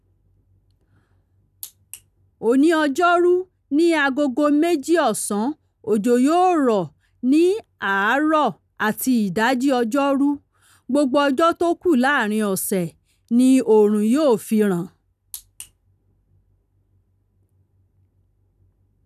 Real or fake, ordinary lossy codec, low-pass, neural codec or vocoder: real; none; 14.4 kHz; none